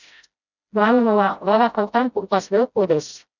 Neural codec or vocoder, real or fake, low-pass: codec, 16 kHz, 0.5 kbps, FreqCodec, smaller model; fake; 7.2 kHz